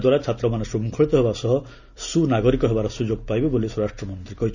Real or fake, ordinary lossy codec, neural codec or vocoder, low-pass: real; none; none; 7.2 kHz